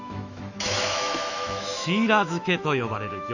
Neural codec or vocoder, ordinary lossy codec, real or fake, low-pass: autoencoder, 48 kHz, 128 numbers a frame, DAC-VAE, trained on Japanese speech; none; fake; 7.2 kHz